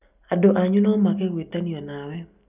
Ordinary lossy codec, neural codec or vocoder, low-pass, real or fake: none; none; 3.6 kHz; real